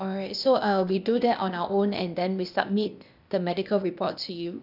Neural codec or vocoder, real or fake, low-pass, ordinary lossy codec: codec, 16 kHz, 0.8 kbps, ZipCodec; fake; 5.4 kHz; none